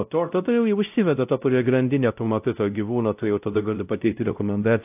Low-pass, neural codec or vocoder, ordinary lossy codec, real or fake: 3.6 kHz; codec, 16 kHz, 0.5 kbps, X-Codec, WavLM features, trained on Multilingual LibriSpeech; AAC, 32 kbps; fake